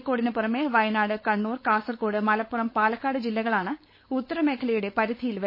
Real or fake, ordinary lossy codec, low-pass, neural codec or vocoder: fake; MP3, 24 kbps; 5.4 kHz; codec, 16 kHz, 4.8 kbps, FACodec